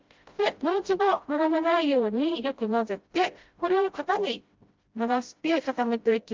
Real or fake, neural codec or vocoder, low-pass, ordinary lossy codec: fake; codec, 16 kHz, 0.5 kbps, FreqCodec, smaller model; 7.2 kHz; Opus, 32 kbps